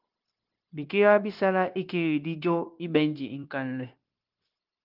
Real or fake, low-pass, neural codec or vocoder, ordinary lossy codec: fake; 5.4 kHz; codec, 16 kHz, 0.9 kbps, LongCat-Audio-Codec; Opus, 32 kbps